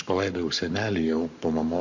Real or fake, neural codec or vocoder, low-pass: fake; codec, 44.1 kHz, 7.8 kbps, Pupu-Codec; 7.2 kHz